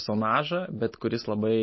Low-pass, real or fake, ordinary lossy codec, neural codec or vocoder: 7.2 kHz; real; MP3, 24 kbps; none